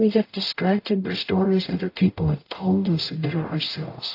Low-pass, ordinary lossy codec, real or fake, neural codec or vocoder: 5.4 kHz; AAC, 32 kbps; fake; codec, 44.1 kHz, 0.9 kbps, DAC